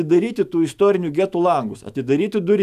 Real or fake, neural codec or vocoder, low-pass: fake; autoencoder, 48 kHz, 128 numbers a frame, DAC-VAE, trained on Japanese speech; 14.4 kHz